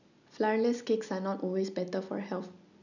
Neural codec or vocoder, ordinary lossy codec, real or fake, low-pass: none; none; real; 7.2 kHz